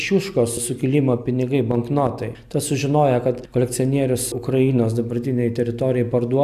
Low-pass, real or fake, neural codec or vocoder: 14.4 kHz; real; none